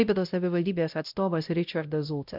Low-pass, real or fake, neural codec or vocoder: 5.4 kHz; fake; codec, 16 kHz, 0.5 kbps, X-Codec, WavLM features, trained on Multilingual LibriSpeech